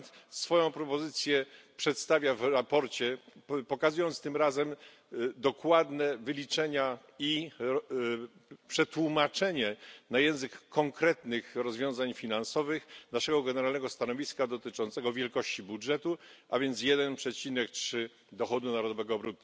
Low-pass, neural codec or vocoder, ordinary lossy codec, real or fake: none; none; none; real